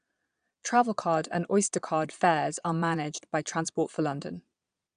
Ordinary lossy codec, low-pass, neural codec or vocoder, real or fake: none; 9.9 kHz; vocoder, 22.05 kHz, 80 mel bands, Vocos; fake